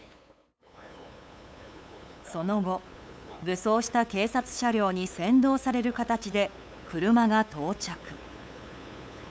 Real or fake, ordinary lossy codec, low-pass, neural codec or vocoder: fake; none; none; codec, 16 kHz, 8 kbps, FunCodec, trained on LibriTTS, 25 frames a second